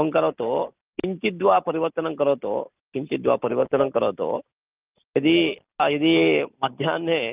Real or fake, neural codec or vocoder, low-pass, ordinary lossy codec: real; none; 3.6 kHz; Opus, 24 kbps